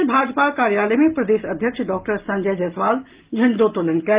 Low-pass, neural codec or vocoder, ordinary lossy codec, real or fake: 3.6 kHz; none; Opus, 32 kbps; real